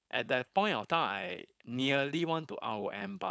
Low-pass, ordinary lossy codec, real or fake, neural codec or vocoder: none; none; fake; codec, 16 kHz, 4.8 kbps, FACodec